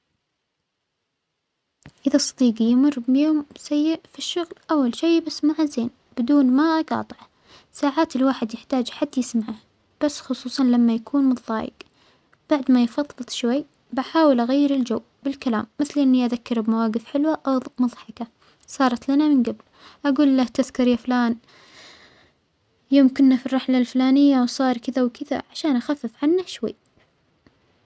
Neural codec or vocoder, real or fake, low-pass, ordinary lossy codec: none; real; none; none